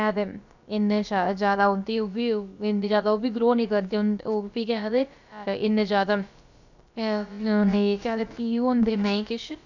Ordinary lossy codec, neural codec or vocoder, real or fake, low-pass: none; codec, 16 kHz, about 1 kbps, DyCAST, with the encoder's durations; fake; 7.2 kHz